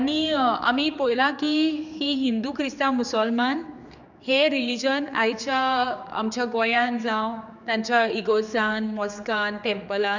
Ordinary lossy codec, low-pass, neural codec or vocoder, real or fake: none; 7.2 kHz; codec, 16 kHz, 4 kbps, X-Codec, HuBERT features, trained on general audio; fake